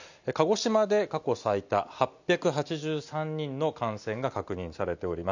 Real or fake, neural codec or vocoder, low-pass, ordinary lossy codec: real; none; 7.2 kHz; none